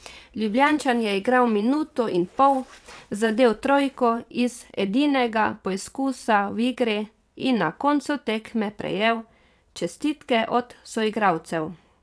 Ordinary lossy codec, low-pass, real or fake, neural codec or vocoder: none; none; fake; vocoder, 22.05 kHz, 80 mel bands, WaveNeXt